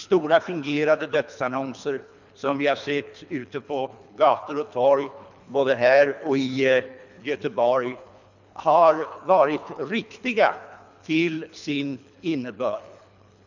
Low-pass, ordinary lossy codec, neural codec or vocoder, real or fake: 7.2 kHz; none; codec, 24 kHz, 3 kbps, HILCodec; fake